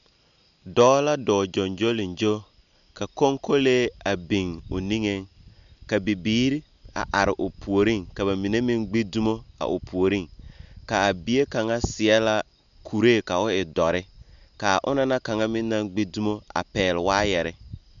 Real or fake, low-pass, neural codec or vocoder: real; 7.2 kHz; none